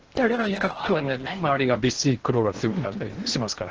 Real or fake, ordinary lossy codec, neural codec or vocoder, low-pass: fake; Opus, 16 kbps; codec, 16 kHz in and 24 kHz out, 0.8 kbps, FocalCodec, streaming, 65536 codes; 7.2 kHz